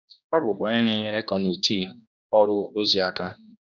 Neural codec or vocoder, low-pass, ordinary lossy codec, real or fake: codec, 16 kHz, 1 kbps, X-Codec, HuBERT features, trained on general audio; 7.2 kHz; none; fake